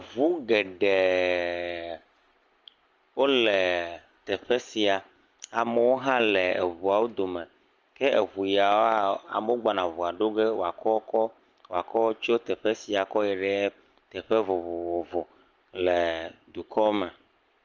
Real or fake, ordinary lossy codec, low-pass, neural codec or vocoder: real; Opus, 24 kbps; 7.2 kHz; none